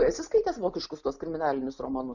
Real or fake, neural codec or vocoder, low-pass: real; none; 7.2 kHz